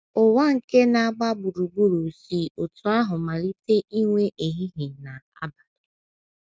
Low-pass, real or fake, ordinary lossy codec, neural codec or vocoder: none; real; none; none